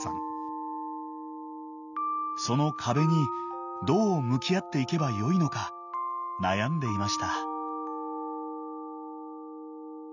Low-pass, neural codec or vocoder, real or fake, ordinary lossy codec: 7.2 kHz; none; real; none